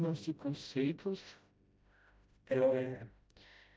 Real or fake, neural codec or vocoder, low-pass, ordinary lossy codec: fake; codec, 16 kHz, 0.5 kbps, FreqCodec, smaller model; none; none